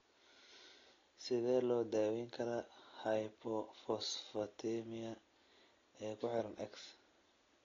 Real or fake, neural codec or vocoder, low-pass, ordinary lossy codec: real; none; 7.2 kHz; AAC, 32 kbps